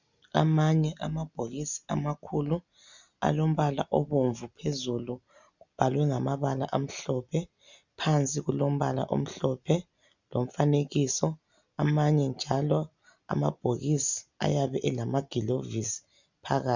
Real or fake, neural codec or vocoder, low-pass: real; none; 7.2 kHz